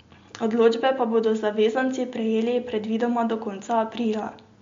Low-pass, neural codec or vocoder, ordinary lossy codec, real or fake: 7.2 kHz; none; MP3, 48 kbps; real